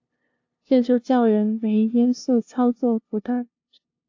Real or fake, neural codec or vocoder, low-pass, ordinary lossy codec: fake; codec, 16 kHz, 0.5 kbps, FunCodec, trained on LibriTTS, 25 frames a second; 7.2 kHz; AAC, 48 kbps